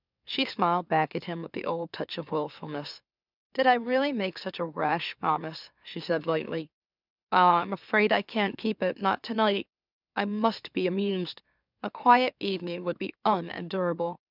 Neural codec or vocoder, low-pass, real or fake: autoencoder, 44.1 kHz, a latent of 192 numbers a frame, MeloTTS; 5.4 kHz; fake